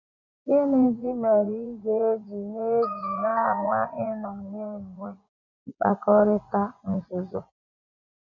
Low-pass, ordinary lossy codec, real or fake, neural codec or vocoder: 7.2 kHz; none; fake; vocoder, 24 kHz, 100 mel bands, Vocos